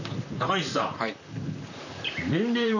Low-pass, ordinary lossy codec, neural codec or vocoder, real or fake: 7.2 kHz; none; vocoder, 44.1 kHz, 128 mel bands, Pupu-Vocoder; fake